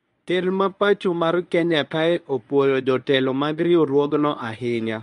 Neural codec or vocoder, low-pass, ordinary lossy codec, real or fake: codec, 24 kHz, 0.9 kbps, WavTokenizer, medium speech release version 1; 10.8 kHz; none; fake